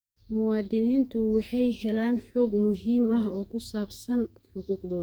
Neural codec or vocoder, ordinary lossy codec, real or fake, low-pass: codec, 44.1 kHz, 2.6 kbps, SNAC; none; fake; none